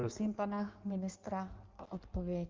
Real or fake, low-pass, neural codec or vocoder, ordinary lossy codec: fake; 7.2 kHz; codec, 16 kHz in and 24 kHz out, 1.1 kbps, FireRedTTS-2 codec; Opus, 32 kbps